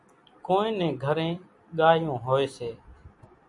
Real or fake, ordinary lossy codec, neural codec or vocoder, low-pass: real; MP3, 96 kbps; none; 10.8 kHz